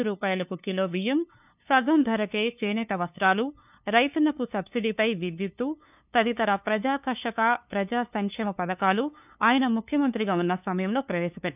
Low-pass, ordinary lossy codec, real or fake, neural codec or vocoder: 3.6 kHz; none; fake; codec, 16 kHz, 2 kbps, FunCodec, trained on LibriTTS, 25 frames a second